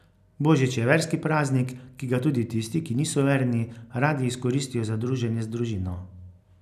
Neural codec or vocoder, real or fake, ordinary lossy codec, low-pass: none; real; none; 14.4 kHz